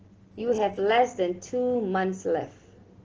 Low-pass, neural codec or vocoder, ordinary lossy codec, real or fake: 7.2 kHz; none; Opus, 16 kbps; real